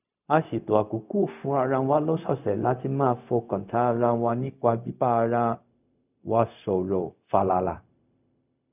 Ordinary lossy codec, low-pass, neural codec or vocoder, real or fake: none; 3.6 kHz; codec, 16 kHz, 0.4 kbps, LongCat-Audio-Codec; fake